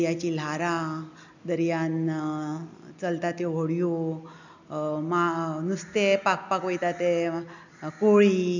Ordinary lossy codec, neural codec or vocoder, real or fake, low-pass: none; none; real; 7.2 kHz